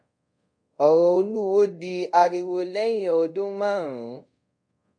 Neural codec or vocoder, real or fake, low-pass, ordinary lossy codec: codec, 24 kHz, 0.5 kbps, DualCodec; fake; 9.9 kHz; AAC, 64 kbps